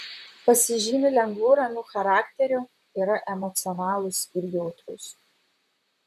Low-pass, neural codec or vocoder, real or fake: 14.4 kHz; vocoder, 44.1 kHz, 128 mel bands, Pupu-Vocoder; fake